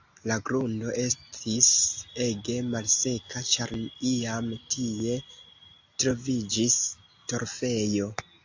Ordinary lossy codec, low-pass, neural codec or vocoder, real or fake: AAC, 48 kbps; 7.2 kHz; none; real